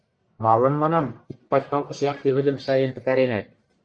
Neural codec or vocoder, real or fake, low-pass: codec, 44.1 kHz, 1.7 kbps, Pupu-Codec; fake; 9.9 kHz